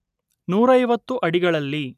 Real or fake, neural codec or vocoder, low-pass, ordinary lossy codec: real; none; 14.4 kHz; none